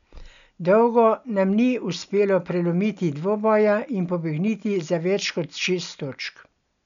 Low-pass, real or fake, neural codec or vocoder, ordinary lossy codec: 7.2 kHz; real; none; none